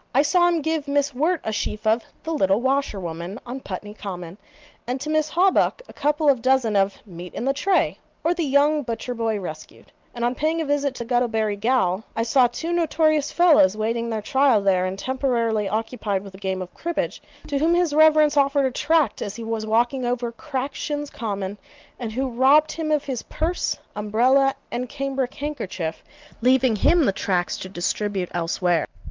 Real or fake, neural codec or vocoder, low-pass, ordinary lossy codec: real; none; 7.2 kHz; Opus, 24 kbps